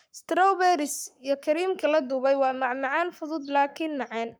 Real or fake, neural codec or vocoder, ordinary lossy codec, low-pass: fake; codec, 44.1 kHz, 7.8 kbps, Pupu-Codec; none; none